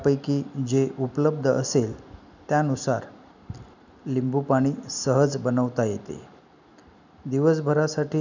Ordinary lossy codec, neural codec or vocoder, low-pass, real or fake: none; none; 7.2 kHz; real